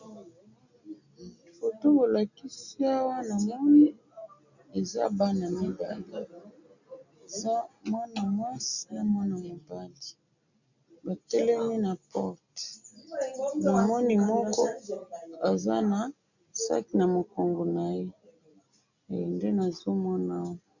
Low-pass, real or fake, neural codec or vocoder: 7.2 kHz; real; none